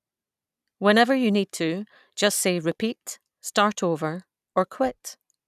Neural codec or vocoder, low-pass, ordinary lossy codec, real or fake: none; 14.4 kHz; none; real